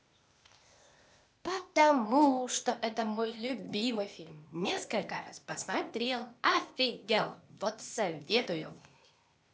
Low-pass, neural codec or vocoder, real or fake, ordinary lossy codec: none; codec, 16 kHz, 0.8 kbps, ZipCodec; fake; none